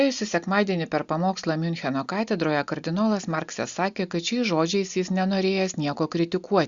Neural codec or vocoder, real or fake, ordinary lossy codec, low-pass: none; real; Opus, 64 kbps; 7.2 kHz